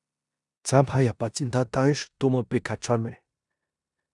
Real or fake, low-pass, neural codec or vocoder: fake; 10.8 kHz; codec, 16 kHz in and 24 kHz out, 0.9 kbps, LongCat-Audio-Codec, four codebook decoder